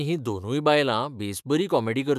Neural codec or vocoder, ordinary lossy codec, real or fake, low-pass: autoencoder, 48 kHz, 128 numbers a frame, DAC-VAE, trained on Japanese speech; none; fake; 14.4 kHz